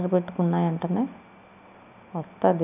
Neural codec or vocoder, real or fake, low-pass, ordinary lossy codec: none; real; 3.6 kHz; none